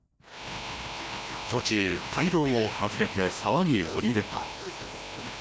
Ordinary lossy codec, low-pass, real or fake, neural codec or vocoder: none; none; fake; codec, 16 kHz, 1 kbps, FreqCodec, larger model